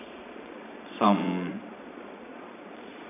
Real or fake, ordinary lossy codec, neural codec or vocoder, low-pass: fake; none; vocoder, 22.05 kHz, 80 mel bands, Vocos; 3.6 kHz